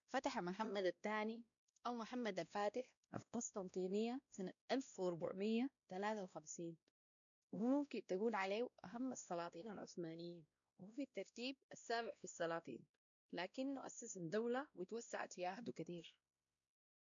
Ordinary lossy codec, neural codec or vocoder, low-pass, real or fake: none; codec, 16 kHz, 1 kbps, X-Codec, WavLM features, trained on Multilingual LibriSpeech; 7.2 kHz; fake